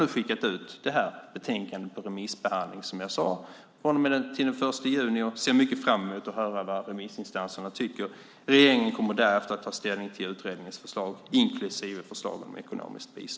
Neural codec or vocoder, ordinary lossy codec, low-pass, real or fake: none; none; none; real